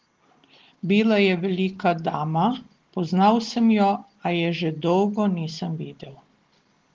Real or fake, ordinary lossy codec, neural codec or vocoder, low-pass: real; Opus, 16 kbps; none; 7.2 kHz